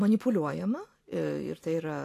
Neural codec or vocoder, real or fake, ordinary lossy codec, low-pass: none; real; AAC, 48 kbps; 14.4 kHz